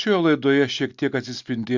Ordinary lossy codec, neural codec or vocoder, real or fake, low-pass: Opus, 64 kbps; none; real; 7.2 kHz